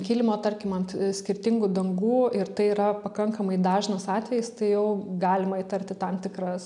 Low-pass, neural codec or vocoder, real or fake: 10.8 kHz; none; real